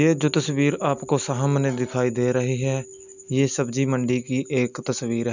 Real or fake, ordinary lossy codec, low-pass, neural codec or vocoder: real; none; 7.2 kHz; none